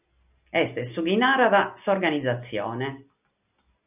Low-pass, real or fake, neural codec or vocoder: 3.6 kHz; real; none